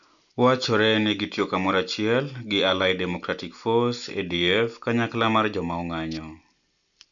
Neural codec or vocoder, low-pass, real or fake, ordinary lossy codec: none; 7.2 kHz; real; MP3, 96 kbps